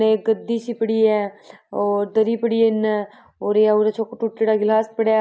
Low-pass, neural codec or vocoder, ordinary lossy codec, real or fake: none; none; none; real